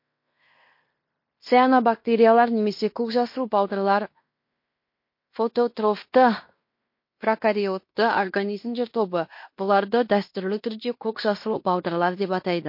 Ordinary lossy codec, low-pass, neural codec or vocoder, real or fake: MP3, 32 kbps; 5.4 kHz; codec, 16 kHz in and 24 kHz out, 0.9 kbps, LongCat-Audio-Codec, fine tuned four codebook decoder; fake